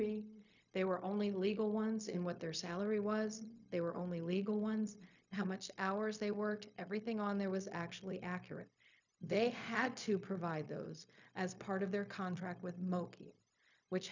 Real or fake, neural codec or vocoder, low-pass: fake; codec, 16 kHz, 0.4 kbps, LongCat-Audio-Codec; 7.2 kHz